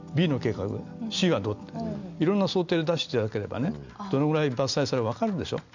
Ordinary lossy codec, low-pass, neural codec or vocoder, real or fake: none; 7.2 kHz; none; real